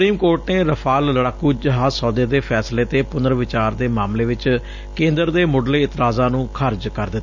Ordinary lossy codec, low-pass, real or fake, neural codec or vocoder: none; 7.2 kHz; real; none